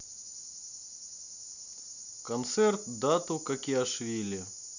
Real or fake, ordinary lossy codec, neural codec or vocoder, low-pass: real; none; none; 7.2 kHz